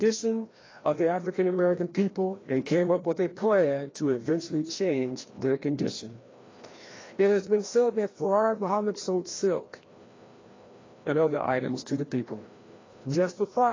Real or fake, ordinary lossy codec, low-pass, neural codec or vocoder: fake; AAC, 32 kbps; 7.2 kHz; codec, 16 kHz, 1 kbps, FreqCodec, larger model